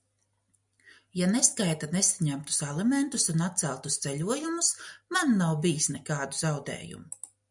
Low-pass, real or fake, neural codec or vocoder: 10.8 kHz; real; none